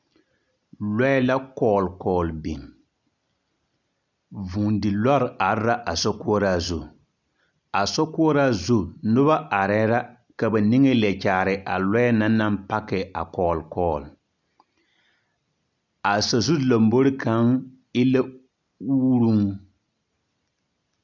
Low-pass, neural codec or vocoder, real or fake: 7.2 kHz; none; real